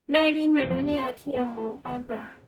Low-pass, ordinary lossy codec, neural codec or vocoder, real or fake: 19.8 kHz; none; codec, 44.1 kHz, 0.9 kbps, DAC; fake